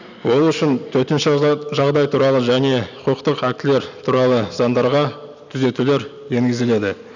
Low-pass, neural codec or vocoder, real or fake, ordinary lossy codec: 7.2 kHz; none; real; none